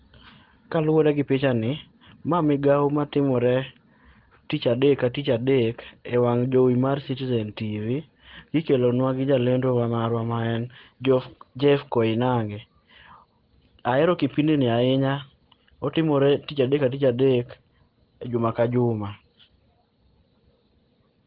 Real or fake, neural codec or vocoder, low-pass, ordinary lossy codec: real; none; 5.4 kHz; Opus, 16 kbps